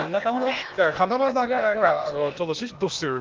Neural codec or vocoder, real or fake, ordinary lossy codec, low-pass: codec, 16 kHz, 0.8 kbps, ZipCodec; fake; Opus, 24 kbps; 7.2 kHz